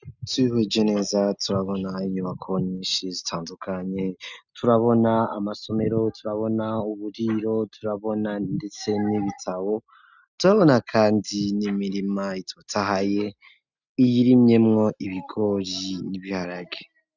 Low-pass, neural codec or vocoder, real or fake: 7.2 kHz; none; real